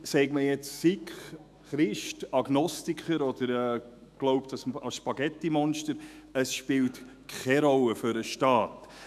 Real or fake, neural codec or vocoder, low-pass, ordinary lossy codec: fake; autoencoder, 48 kHz, 128 numbers a frame, DAC-VAE, trained on Japanese speech; 14.4 kHz; none